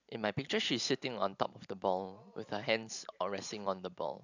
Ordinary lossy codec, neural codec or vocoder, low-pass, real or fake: none; vocoder, 44.1 kHz, 128 mel bands every 512 samples, BigVGAN v2; 7.2 kHz; fake